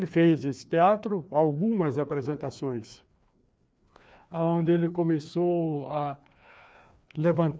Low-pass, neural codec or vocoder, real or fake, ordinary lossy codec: none; codec, 16 kHz, 2 kbps, FreqCodec, larger model; fake; none